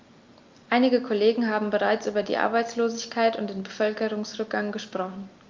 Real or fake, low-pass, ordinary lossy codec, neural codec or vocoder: real; 7.2 kHz; Opus, 32 kbps; none